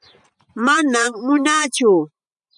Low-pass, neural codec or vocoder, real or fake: 10.8 kHz; vocoder, 44.1 kHz, 128 mel bands every 512 samples, BigVGAN v2; fake